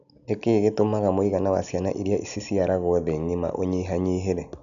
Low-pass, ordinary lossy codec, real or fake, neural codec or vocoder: 7.2 kHz; none; real; none